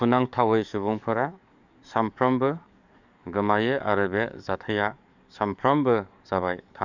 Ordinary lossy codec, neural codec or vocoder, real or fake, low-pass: none; codec, 44.1 kHz, 7.8 kbps, DAC; fake; 7.2 kHz